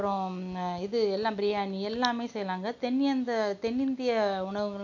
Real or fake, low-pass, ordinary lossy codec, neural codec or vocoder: real; 7.2 kHz; none; none